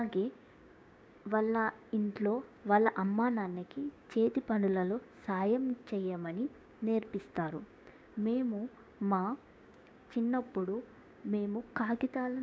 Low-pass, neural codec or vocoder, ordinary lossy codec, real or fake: none; codec, 16 kHz, 6 kbps, DAC; none; fake